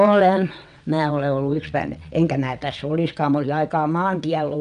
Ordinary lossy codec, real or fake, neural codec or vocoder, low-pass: none; fake; vocoder, 22.05 kHz, 80 mel bands, WaveNeXt; 9.9 kHz